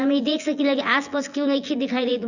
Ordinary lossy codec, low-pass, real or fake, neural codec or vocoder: none; 7.2 kHz; fake; vocoder, 24 kHz, 100 mel bands, Vocos